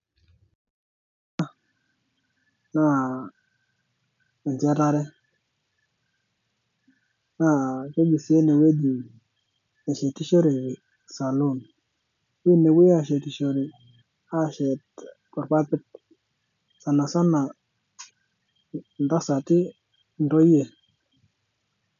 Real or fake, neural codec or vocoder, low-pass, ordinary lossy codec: real; none; 7.2 kHz; MP3, 96 kbps